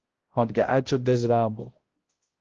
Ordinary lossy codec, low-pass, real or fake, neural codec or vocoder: Opus, 16 kbps; 7.2 kHz; fake; codec, 16 kHz, 0.5 kbps, X-Codec, HuBERT features, trained on balanced general audio